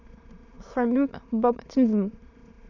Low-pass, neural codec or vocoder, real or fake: 7.2 kHz; autoencoder, 22.05 kHz, a latent of 192 numbers a frame, VITS, trained on many speakers; fake